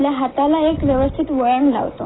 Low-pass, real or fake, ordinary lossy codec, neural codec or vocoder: 7.2 kHz; real; AAC, 16 kbps; none